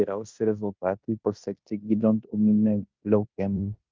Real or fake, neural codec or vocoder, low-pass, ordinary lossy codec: fake; codec, 16 kHz in and 24 kHz out, 0.9 kbps, LongCat-Audio-Codec, fine tuned four codebook decoder; 7.2 kHz; Opus, 24 kbps